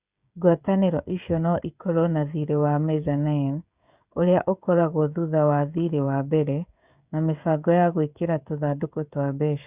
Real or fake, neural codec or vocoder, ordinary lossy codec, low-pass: fake; codec, 16 kHz, 16 kbps, FreqCodec, smaller model; Opus, 64 kbps; 3.6 kHz